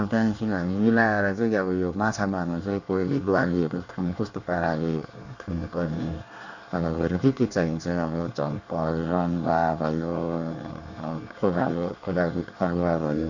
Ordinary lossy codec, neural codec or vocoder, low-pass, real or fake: none; codec, 24 kHz, 1 kbps, SNAC; 7.2 kHz; fake